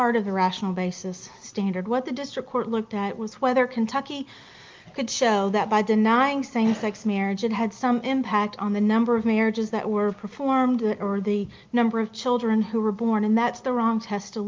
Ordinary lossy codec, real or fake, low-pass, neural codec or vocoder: Opus, 24 kbps; real; 7.2 kHz; none